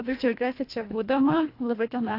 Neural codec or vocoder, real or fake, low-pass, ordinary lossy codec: codec, 24 kHz, 1.5 kbps, HILCodec; fake; 5.4 kHz; AAC, 32 kbps